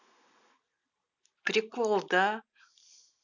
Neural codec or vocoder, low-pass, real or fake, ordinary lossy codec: none; 7.2 kHz; real; none